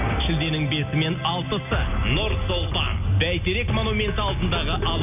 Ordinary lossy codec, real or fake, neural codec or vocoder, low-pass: none; real; none; 3.6 kHz